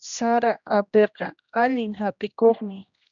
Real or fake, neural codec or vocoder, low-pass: fake; codec, 16 kHz, 1 kbps, X-Codec, HuBERT features, trained on general audio; 7.2 kHz